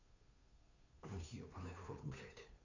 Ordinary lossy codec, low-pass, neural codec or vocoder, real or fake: none; 7.2 kHz; codec, 16 kHz, 2 kbps, FunCodec, trained on Chinese and English, 25 frames a second; fake